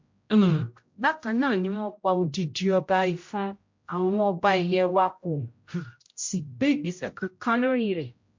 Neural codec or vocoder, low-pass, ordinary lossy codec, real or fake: codec, 16 kHz, 0.5 kbps, X-Codec, HuBERT features, trained on general audio; 7.2 kHz; MP3, 48 kbps; fake